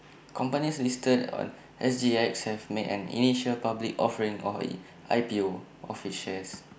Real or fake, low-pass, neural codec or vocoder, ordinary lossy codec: real; none; none; none